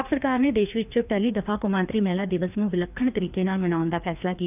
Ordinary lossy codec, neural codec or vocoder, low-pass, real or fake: none; codec, 16 kHz, 2 kbps, FreqCodec, larger model; 3.6 kHz; fake